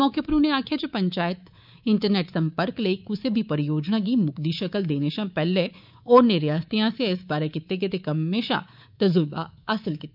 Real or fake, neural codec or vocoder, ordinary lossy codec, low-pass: fake; codec, 24 kHz, 3.1 kbps, DualCodec; none; 5.4 kHz